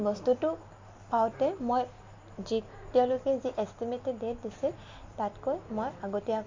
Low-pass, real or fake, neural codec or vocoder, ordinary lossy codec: 7.2 kHz; real; none; MP3, 48 kbps